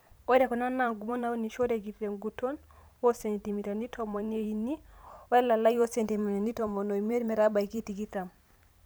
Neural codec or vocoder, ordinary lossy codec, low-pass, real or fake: vocoder, 44.1 kHz, 128 mel bands every 512 samples, BigVGAN v2; none; none; fake